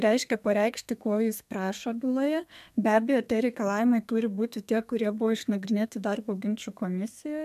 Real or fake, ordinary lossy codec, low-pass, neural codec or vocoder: fake; MP3, 96 kbps; 14.4 kHz; codec, 32 kHz, 1.9 kbps, SNAC